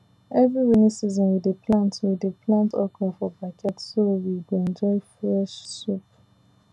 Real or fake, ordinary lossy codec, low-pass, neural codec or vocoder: real; none; none; none